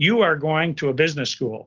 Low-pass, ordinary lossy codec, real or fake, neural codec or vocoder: 7.2 kHz; Opus, 16 kbps; real; none